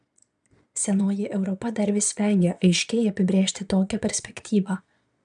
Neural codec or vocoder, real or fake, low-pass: vocoder, 22.05 kHz, 80 mel bands, Vocos; fake; 9.9 kHz